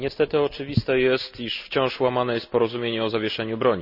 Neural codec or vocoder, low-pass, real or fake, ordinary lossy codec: none; 5.4 kHz; real; none